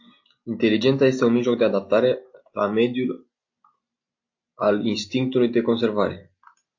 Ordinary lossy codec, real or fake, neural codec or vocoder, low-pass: AAC, 48 kbps; real; none; 7.2 kHz